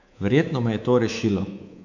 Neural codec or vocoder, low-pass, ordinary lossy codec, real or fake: codec, 24 kHz, 3.1 kbps, DualCodec; 7.2 kHz; none; fake